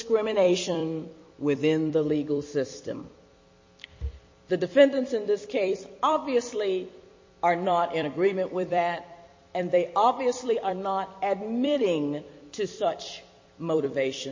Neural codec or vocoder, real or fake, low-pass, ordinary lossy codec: vocoder, 44.1 kHz, 128 mel bands every 256 samples, BigVGAN v2; fake; 7.2 kHz; MP3, 32 kbps